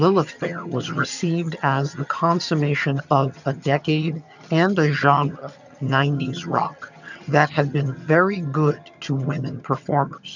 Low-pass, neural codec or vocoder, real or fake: 7.2 kHz; vocoder, 22.05 kHz, 80 mel bands, HiFi-GAN; fake